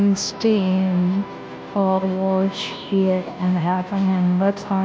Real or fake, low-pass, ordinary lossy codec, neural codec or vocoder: fake; none; none; codec, 16 kHz, 0.5 kbps, FunCodec, trained on Chinese and English, 25 frames a second